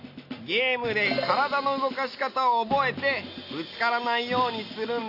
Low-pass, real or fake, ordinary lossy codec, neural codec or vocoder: 5.4 kHz; real; AAC, 32 kbps; none